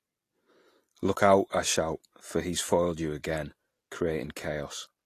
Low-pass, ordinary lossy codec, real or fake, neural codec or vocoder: 14.4 kHz; AAC, 48 kbps; real; none